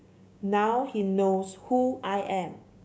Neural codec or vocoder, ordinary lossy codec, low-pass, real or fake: codec, 16 kHz, 6 kbps, DAC; none; none; fake